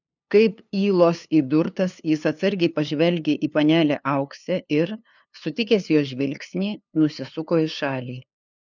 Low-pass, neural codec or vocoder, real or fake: 7.2 kHz; codec, 16 kHz, 2 kbps, FunCodec, trained on LibriTTS, 25 frames a second; fake